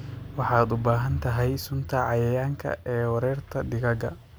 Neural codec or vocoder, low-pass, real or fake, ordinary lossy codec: none; none; real; none